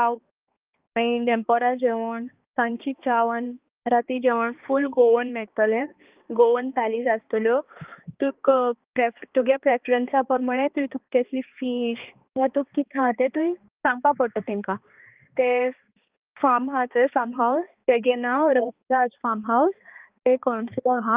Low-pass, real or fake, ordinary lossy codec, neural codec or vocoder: 3.6 kHz; fake; Opus, 32 kbps; codec, 16 kHz, 2 kbps, X-Codec, HuBERT features, trained on balanced general audio